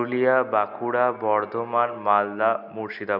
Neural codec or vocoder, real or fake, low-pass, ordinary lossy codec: none; real; 5.4 kHz; none